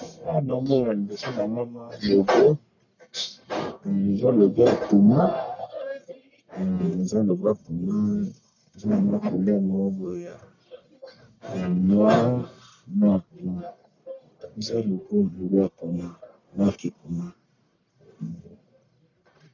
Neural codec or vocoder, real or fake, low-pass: codec, 44.1 kHz, 1.7 kbps, Pupu-Codec; fake; 7.2 kHz